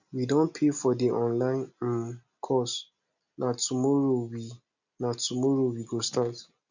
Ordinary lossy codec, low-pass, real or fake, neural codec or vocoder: none; 7.2 kHz; real; none